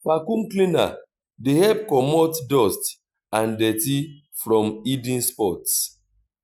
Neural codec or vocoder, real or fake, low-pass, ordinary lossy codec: none; real; none; none